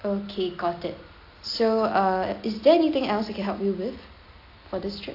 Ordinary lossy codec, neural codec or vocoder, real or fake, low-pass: AAC, 32 kbps; none; real; 5.4 kHz